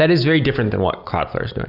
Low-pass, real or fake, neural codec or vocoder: 5.4 kHz; real; none